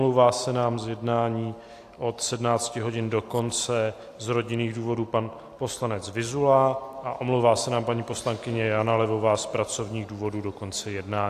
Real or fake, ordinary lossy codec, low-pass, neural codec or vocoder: real; AAC, 64 kbps; 14.4 kHz; none